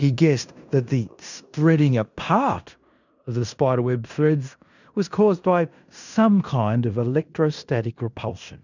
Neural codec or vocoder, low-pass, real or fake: codec, 16 kHz in and 24 kHz out, 0.9 kbps, LongCat-Audio-Codec, fine tuned four codebook decoder; 7.2 kHz; fake